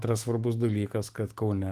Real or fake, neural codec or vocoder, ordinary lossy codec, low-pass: fake; autoencoder, 48 kHz, 128 numbers a frame, DAC-VAE, trained on Japanese speech; Opus, 24 kbps; 14.4 kHz